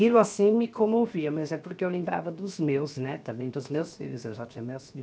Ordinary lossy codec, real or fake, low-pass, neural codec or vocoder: none; fake; none; codec, 16 kHz, 0.7 kbps, FocalCodec